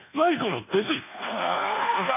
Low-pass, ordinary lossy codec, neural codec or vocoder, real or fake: 3.6 kHz; none; codec, 24 kHz, 1.2 kbps, DualCodec; fake